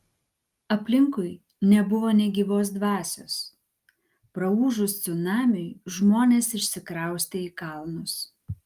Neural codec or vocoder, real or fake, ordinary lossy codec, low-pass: none; real; Opus, 32 kbps; 14.4 kHz